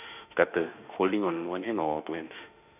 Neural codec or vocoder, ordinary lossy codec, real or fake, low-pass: autoencoder, 48 kHz, 32 numbers a frame, DAC-VAE, trained on Japanese speech; none; fake; 3.6 kHz